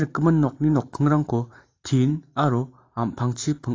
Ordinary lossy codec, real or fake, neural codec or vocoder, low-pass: AAC, 32 kbps; fake; vocoder, 44.1 kHz, 128 mel bands every 512 samples, BigVGAN v2; 7.2 kHz